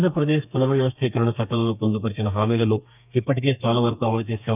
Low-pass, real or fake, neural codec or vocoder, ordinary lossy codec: 3.6 kHz; fake; codec, 32 kHz, 1.9 kbps, SNAC; none